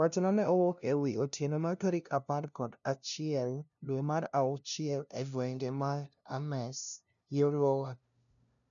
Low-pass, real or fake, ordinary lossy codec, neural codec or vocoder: 7.2 kHz; fake; none; codec, 16 kHz, 0.5 kbps, FunCodec, trained on LibriTTS, 25 frames a second